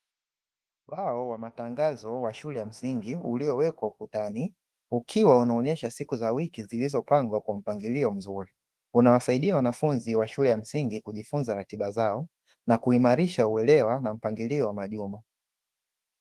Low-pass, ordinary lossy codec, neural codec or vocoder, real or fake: 14.4 kHz; Opus, 24 kbps; autoencoder, 48 kHz, 32 numbers a frame, DAC-VAE, trained on Japanese speech; fake